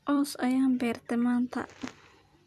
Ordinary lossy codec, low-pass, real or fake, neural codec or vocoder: none; 14.4 kHz; fake; vocoder, 44.1 kHz, 128 mel bands every 256 samples, BigVGAN v2